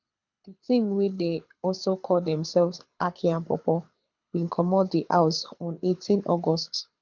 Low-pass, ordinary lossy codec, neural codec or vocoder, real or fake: 7.2 kHz; none; codec, 24 kHz, 6 kbps, HILCodec; fake